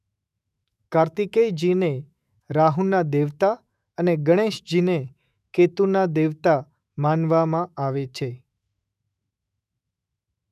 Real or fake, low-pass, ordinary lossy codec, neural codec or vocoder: fake; 14.4 kHz; none; autoencoder, 48 kHz, 128 numbers a frame, DAC-VAE, trained on Japanese speech